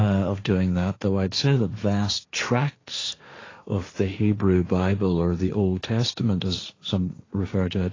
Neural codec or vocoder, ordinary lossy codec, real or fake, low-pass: codec, 16 kHz, 1.1 kbps, Voila-Tokenizer; AAC, 32 kbps; fake; 7.2 kHz